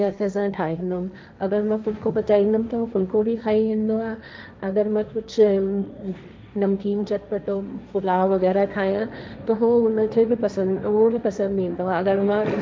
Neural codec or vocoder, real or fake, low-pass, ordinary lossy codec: codec, 16 kHz, 1.1 kbps, Voila-Tokenizer; fake; none; none